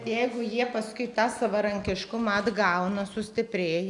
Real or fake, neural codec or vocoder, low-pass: real; none; 10.8 kHz